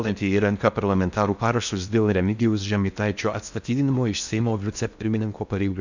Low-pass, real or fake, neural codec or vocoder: 7.2 kHz; fake; codec, 16 kHz in and 24 kHz out, 0.6 kbps, FocalCodec, streaming, 4096 codes